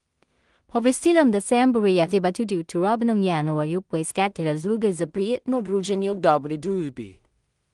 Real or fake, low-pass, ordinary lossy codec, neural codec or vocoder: fake; 10.8 kHz; Opus, 24 kbps; codec, 16 kHz in and 24 kHz out, 0.4 kbps, LongCat-Audio-Codec, two codebook decoder